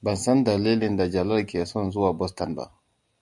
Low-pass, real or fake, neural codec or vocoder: 10.8 kHz; real; none